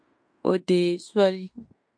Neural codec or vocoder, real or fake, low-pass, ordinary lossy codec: codec, 16 kHz in and 24 kHz out, 0.9 kbps, LongCat-Audio-Codec, fine tuned four codebook decoder; fake; 9.9 kHz; MP3, 48 kbps